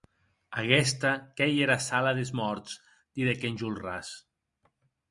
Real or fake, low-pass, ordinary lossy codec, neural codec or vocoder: real; 10.8 kHz; Opus, 64 kbps; none